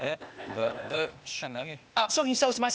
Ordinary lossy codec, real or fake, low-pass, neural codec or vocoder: none; fake; none; codec, 16 kHz, 0.8 kbps, ZipCodec